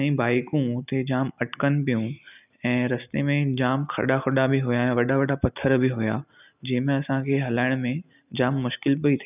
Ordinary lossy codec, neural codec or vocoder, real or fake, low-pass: none; none; real; 3.6 kHz